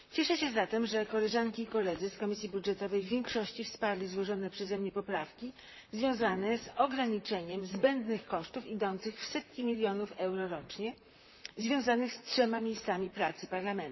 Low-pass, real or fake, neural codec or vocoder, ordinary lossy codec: 7.2 kHz; fake; vocoder, 44.1 kHz, 128 mel bands, Pupu-Vocoder; MP3, 24 kbps